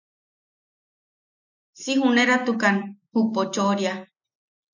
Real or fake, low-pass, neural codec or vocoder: real; 7.2 kHz; none